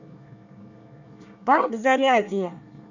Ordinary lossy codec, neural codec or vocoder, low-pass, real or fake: none; codec, 24 kHz, 1 kbps, SNAC; 7.2 kHz; fake